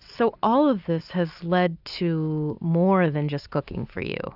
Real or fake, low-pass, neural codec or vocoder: real; 5.4 kHz; none